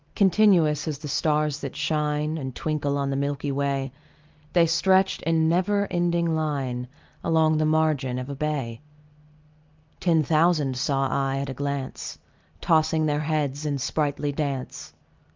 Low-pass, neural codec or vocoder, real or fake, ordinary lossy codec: 7.2 kHz; none; real; Opus, 32 kbps